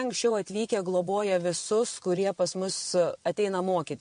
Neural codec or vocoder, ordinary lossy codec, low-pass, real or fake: vocoder, 22.05 kHz, 80 mel bands, WaveNeXt; MP3, 48 kbps; 9.9 kHz; fake